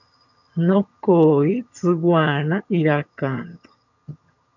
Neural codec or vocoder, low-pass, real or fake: vocoder, 22.05 kHz, 80 mel bands, HiFi-GAN; 7.2 kHz; fake